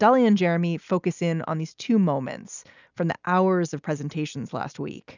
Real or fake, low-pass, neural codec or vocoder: real; 7.2 kHz; none